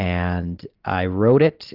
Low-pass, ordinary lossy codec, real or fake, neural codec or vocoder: 5.4 kHz; Opus, 16 kbps; real; none